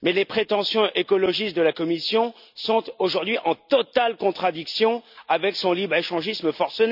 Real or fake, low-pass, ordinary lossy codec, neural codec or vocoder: real; 5.4 kHz; none; none